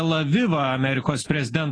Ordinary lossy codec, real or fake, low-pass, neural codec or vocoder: AAC, 32 kbps; real; 9.9 kHz; none